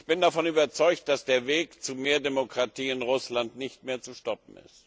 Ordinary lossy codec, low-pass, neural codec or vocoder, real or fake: none; none; none; real